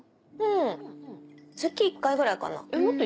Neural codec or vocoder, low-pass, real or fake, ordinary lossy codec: none; none; real; none